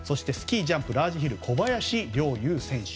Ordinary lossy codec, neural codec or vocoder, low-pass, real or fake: none; none; none; real